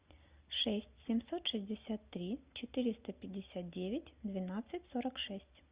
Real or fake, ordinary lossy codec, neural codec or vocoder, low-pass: real; Opus, 64 kbps; none; 3.6 kHz